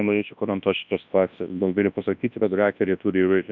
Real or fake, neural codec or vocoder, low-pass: fake; codec, 24 kHz, 0.9 kbps, WavTokenizer, large speech release; 7.2 kHz